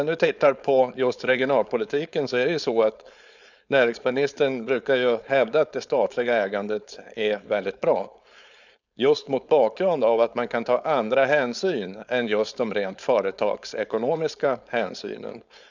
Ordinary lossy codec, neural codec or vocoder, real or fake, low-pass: none; codec, 16 kHz, 4.8 kbps, FACodec; fake; 7.2 kHz